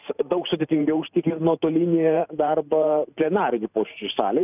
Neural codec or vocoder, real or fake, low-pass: vocoder, 44.1 kHz, 128 mel bands every 512 samples, BigVGAN v2; fake; 3.6 kHz